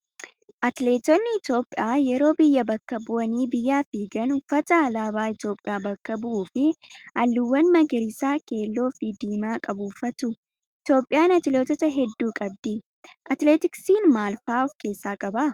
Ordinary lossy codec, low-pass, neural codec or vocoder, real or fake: Opus, 64 kbps; 19.8 kHz; autoencoder, 48 kHz, 128 numbers a frame, DAC-VAE, trained on Japanese speech; fake